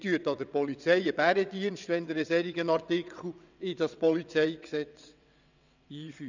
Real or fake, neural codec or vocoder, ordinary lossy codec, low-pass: fake; vocoder, 22.05 kHz, 80 mel bands, WaveNeXt; none; 7.2 kHz